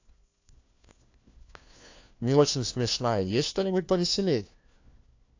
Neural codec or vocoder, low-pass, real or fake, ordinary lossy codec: codec, 16 kHz, 1 kbps, FunCodec, trained on LibriTTS, 50 frames a second; 7.2 kHz; fake; AAC, 48 kbps